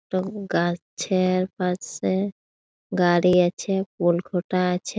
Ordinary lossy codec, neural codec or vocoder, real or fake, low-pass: none; none; real; none